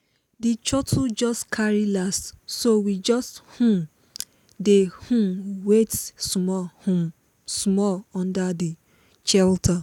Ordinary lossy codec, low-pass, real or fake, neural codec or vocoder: none; 19.8 kHz; real; none